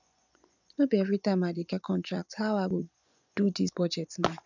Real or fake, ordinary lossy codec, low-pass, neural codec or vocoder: fake; none; 7.2 kHz; vocoder, 24 kHz, 100 mel bands, Vocos